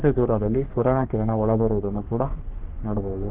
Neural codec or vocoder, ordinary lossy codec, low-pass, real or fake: codec, 44.1 kHz, 3.4 kbps, Pupu-Codec; Opus, 16 kbps; 3.6 kHz; fake